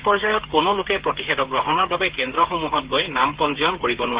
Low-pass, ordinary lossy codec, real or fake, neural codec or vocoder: 3.6 kHz; Opus, 16 kbps; fake; codec, 16 kHz, 8 kbps, FreqCodec, smaller model